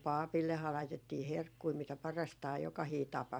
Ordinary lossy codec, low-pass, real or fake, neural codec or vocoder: none; none; fake; vocoder, 44.1 kHz, 128 mel bands every 256 samples, BigVGAN v2